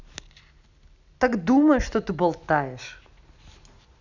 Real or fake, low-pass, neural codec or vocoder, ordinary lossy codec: real; 7.2 kHz; none; none